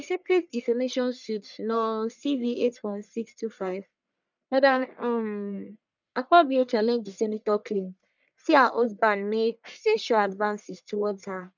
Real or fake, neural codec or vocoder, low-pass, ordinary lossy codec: fake; codec, 44.1 kHz, 1.7 kbps, Pupu-Codec; 7.2 kHz; none